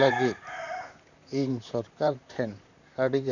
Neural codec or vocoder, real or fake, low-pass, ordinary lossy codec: vocoder, 44.1 kHz, 128 mel bands, Pupu-Vocoder; fake; 7.2 kHz; none